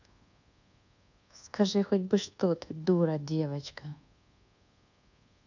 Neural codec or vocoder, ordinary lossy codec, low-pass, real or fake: codec, 24 kHz, 1.2 kbps, DualCodec; none; 7.2 kHz; fake